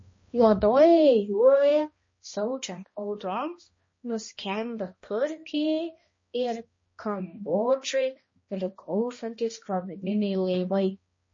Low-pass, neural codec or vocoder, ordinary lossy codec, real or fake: 7.2 kHz; codec, 16 kHz, 1 kbps, X-Codec, HuBERT features, trained on balanced general audio; MP3, 32 kbps; fake